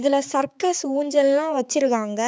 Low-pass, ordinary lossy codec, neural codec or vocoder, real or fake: none; none; codec, 16 kHz, 4 kbps, X-Codec, HuBERT features, trained on balanced general audio; fake